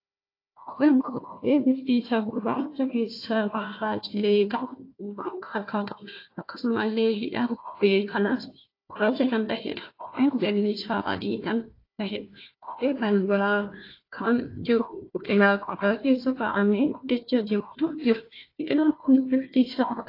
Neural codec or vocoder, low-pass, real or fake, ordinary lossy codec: codec, 16 kHz, 1 kbps, FunCodec, trained on Chinese and English, 50 frames a second; 5.4 kHz; fake; AAC, 32 kbps